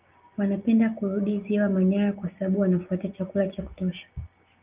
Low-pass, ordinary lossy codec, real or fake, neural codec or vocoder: 3.6 kHz; Opus, 24 kbps; real; none